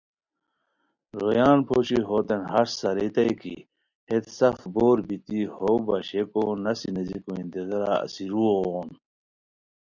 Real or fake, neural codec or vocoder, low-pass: real; none; 7.2 kHz